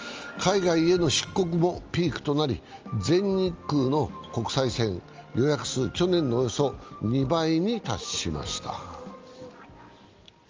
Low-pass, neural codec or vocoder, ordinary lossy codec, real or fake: 7.2 kHz; none; Opus, 24 kbps; real